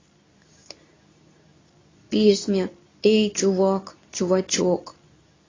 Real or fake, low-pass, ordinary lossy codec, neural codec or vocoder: fake; 7.2 kHz; AAC, 32 kbps; codec, 24 kHz, 0.9 kbps, WavTokenizer, medium speech release version 2